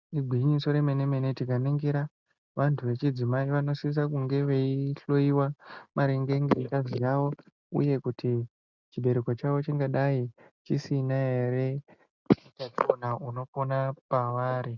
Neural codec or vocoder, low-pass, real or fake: none; 7.2 kHz; real